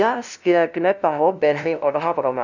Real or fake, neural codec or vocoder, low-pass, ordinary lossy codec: fake; codec, 16 kHz, 0.5 kbps, FunCodec, trained on LibriTTS, 25 frames a second; 7.2 kHz; none